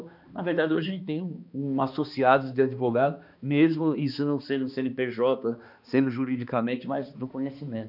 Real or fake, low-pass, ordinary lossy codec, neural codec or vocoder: fake; 5.4 kHz; none; codec, 16 kHz, 2 kbps, X-Codec, HuBERT features, trained on balanced general audio